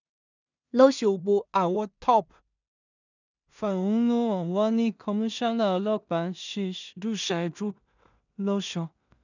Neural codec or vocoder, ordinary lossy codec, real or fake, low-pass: codec, 16 kHz in and 24 kHz out, 0.4 kbps, LongCat-Audio-Codec, two codebook decoder; none; fake; 7.2 kHz